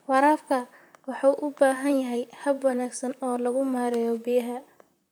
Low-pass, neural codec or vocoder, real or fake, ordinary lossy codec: none; vocoder, 44.1 kHz, 128 mel bands, Pupu-Vocoder; fake; none